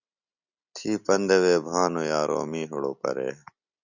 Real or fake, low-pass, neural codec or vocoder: real; 7.2 kHz; none